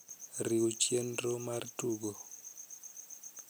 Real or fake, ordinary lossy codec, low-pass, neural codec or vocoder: real; none; none; none